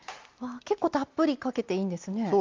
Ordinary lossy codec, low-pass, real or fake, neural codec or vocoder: Opus, 32 kbps; 7.2 kHz; real; none